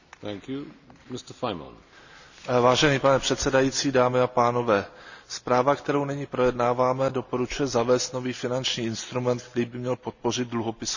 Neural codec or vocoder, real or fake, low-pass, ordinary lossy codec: none; real; 7.2 kHz; none